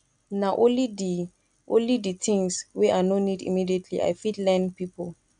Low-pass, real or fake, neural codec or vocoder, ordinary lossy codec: 9.9 kHz; real; none; none